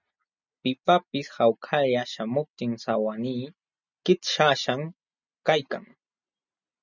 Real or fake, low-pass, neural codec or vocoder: real; 7.2 kHz; none